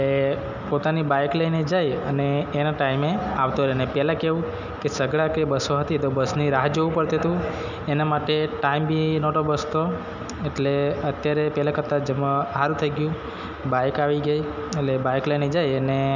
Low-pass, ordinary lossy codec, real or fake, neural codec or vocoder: 7.2 kHz; none; real; none